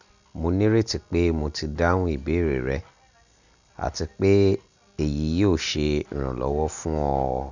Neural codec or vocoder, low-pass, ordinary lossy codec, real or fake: none; 7.2 kHz; none; real